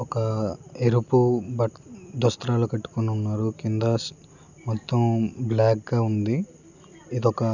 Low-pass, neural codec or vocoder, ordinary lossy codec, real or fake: 7.2 kHz; none; none; real